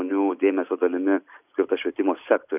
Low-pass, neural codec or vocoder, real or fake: 3.6 kHz; none; real